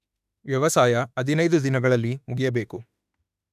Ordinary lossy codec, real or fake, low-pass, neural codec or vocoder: none; fake; 14.4 kHz; autoencoder, 48 kHz, 32 numbers a frame, DAC-VAE, trained on Japanese speech